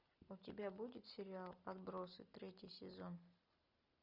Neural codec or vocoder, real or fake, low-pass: none; real; 5.4 kHz